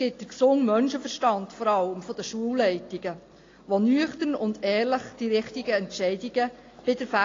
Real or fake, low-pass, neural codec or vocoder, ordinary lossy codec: real; 7.2 kHz; none; AAC, 32 kbps